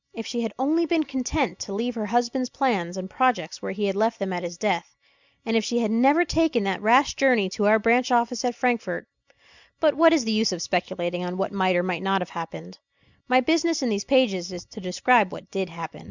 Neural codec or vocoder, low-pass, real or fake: none; 7.2 kHz; real